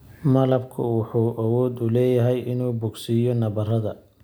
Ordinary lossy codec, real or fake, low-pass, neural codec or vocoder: none; real; none; none